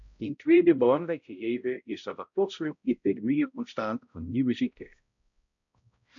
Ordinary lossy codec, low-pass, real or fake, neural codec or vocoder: AAC, 64 kbps; 7.2 kHz; fake; codec, 16 kHz, 0.5 kbps, X-Codec, HuBERT features, trained on balanced general audio